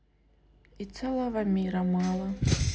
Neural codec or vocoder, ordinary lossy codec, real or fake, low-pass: none; none; real; none